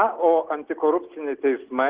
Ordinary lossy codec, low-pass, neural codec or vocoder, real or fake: Opus, 16 kbps; 3.6 kHz; none; real